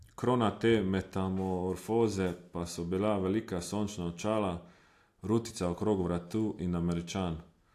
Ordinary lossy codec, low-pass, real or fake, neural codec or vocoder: AAC, 64 kbps; 14.4 kHz; real; none